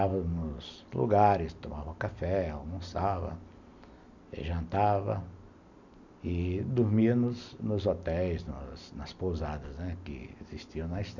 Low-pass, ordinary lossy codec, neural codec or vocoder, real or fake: 7.2 kHz; none; none; real